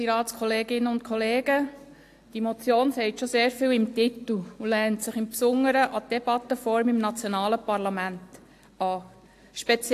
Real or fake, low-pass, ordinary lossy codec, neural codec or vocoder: real; 14.4 kHz; AAC, 64 kbps; none